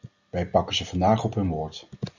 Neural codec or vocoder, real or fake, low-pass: none; real; 7.2 kHz